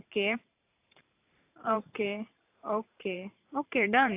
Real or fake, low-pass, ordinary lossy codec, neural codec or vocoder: fake; 3.6 kHz; AAC, 32 kbps; vocoder, 44.1 kHz, 128 mel bands every 512 samples, BigVGAN v2